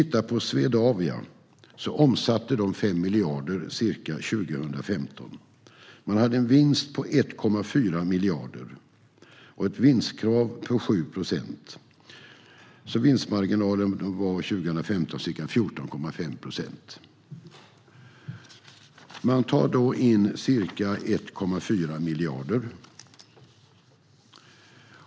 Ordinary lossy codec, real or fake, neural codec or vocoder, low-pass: none; real; none; none